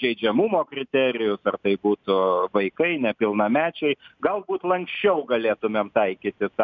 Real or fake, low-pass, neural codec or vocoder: real; 7.2 kHz; none